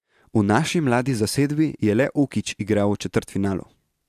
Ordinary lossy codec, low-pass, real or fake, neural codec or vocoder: AAC, 96 kbps; 14.4 kHz; real; none